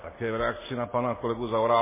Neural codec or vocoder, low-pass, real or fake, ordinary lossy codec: none; 3.6 kHz; real; MP3, 16 kbps